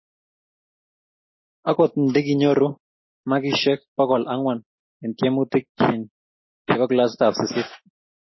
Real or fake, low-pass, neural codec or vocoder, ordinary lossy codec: real; 7.2 kHz; none; MP3, 24 kbps